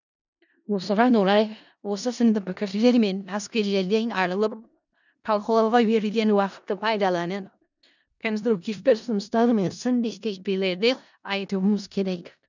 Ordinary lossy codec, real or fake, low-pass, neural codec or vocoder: none; fake; 7.2 kHz; codec, 16 kHz in and 24 kHz out, 0.4 kbps, LongCat-Audio-Codec, four codebook decoder